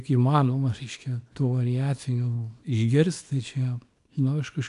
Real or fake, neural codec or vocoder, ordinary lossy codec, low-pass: fake; codec, 24 kHz, 0.9 kbps, WavTokenizer, small release; AAC, 64 kbps; 10.8 kHz